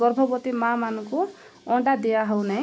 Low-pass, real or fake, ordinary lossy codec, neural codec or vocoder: none; real; none; none